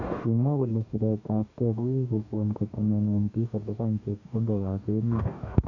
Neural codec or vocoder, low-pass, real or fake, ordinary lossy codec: codec, 44.1 kHz, 2.6 kbps, DAC; 7.2 kHz; fake; none